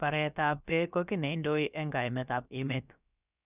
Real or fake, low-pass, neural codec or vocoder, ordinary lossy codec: fake; 3.6 kHz; codec, 16 kHz, about 1 kbps, DyCAST, with the encoder's durations; none